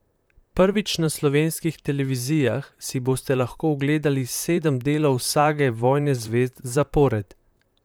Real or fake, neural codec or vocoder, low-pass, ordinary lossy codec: fake; vocoder, 44.1 kHz, 128 mel bands, Pupu-Vocoder; none; none